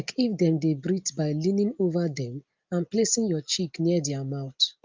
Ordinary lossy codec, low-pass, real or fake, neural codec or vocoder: Opus, 24 kbps; 7.2 kHz; real; none